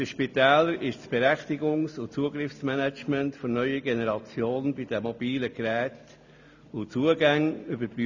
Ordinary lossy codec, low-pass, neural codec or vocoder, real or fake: none; 7.2 kHz; none; real